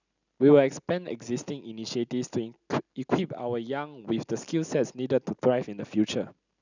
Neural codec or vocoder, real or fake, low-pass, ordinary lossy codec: none; real; 7.2 kHz; none